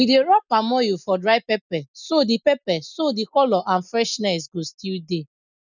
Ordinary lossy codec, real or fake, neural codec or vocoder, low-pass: none; real; none; 7.2 kHz